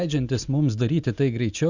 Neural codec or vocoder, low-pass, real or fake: none; 7.2 kHz; real